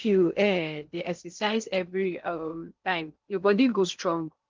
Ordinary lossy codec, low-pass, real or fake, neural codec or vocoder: Opus, 32 kbps; 7.2 kHz; fake; codec, 16 kHz in and 24 kHz out, 0.6 kbps, FocalCodec, streaming, 2048 codes